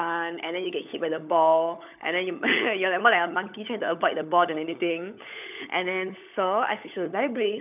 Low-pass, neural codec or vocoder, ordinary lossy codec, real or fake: 3.6 kHz; codec, 16 kHz, 16 kbps, FunCodec, trained on LibriTTS, 50 frames a second; none; fake